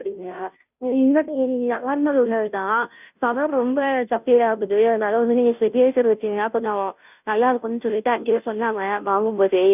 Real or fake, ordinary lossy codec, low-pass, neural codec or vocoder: fake; none; 3.6 kHz; codec, 16 kHz, 0.5 kbps, FunCodec, trained on Chinese and English, 25 frames a second